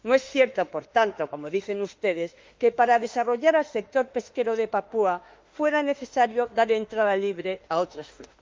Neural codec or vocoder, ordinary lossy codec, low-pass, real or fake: autoencoder, 48 kHz, 32 numbers a frame, DAC-VAE, trained on Japanese speech; Opus, 32 kbps; 7.2 kHz; fake